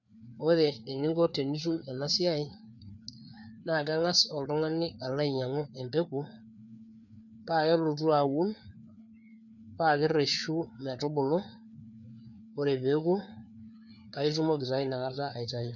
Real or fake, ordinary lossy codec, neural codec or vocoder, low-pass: fake; none; codec, 16 kHz, 4 kbps, FreqCodec, larger model; 7.2 kHz